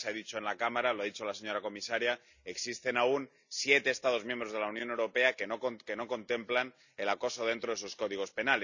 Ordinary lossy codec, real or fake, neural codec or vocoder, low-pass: none; real; none; 7.2 kHz